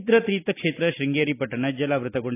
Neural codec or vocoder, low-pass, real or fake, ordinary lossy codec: none; 3.6 kHz; real; AAC, 24 kbps